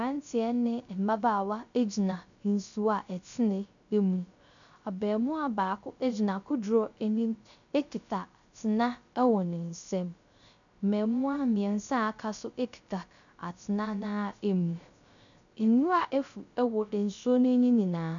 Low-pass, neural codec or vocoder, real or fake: 7.2 kHz; codec, 16 kHz, 0.3 kbps, FocalCodec; fake